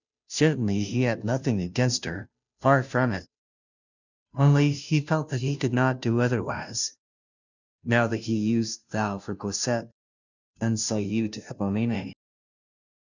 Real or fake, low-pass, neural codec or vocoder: fake; 7.2 kHz; codec, 16 kHz, 0.5 kbps, FunCodec, trained on Chinese and English, 25 frames a second